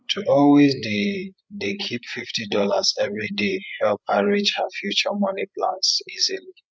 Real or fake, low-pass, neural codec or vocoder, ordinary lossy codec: fake; none; codec, 16 kHz, 8 kbps, FreqCodec, larger model; none